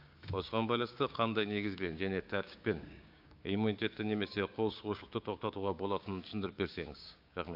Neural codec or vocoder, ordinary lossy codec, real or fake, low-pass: codec, 16 kHz, 6 kbps, DAC; AAC, 48 kbps; fake; 5.4 kHz